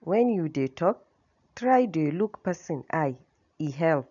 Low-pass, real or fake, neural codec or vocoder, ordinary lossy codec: 7.2 kHz; real; none; none